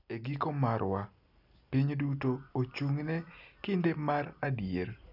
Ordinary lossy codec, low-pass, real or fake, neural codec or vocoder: none; 5.4 kHz; real; none